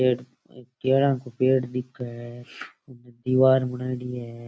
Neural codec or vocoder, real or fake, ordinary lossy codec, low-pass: none; real; none; none